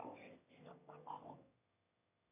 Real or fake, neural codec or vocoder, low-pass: fake; autoencoder, 22.05 kHz, a latent of 192 numbers a frame, VITS, trained on one speaker; 3.6 kHz